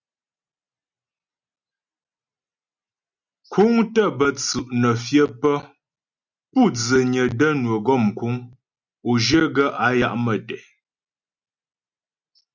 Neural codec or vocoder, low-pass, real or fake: none; 7.2 kHz; real